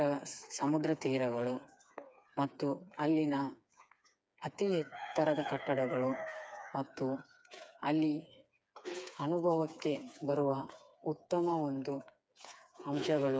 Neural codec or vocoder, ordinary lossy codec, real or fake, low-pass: codec, 16 kHz, 4 kbps, FreqCodec, smaller model; none; fake; none